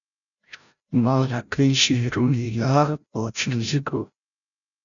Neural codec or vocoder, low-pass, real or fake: codec, 16 kHz, 0.5 kbps, FreqCodec, larger model; 7.2 kHz; fake